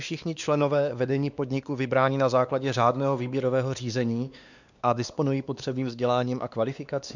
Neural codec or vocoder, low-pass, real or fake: codec, 16 kHz, 2 kbps, X-Codec, WavLM features, trained on Multilingual LibriSpeech; 7.2 kHz; fake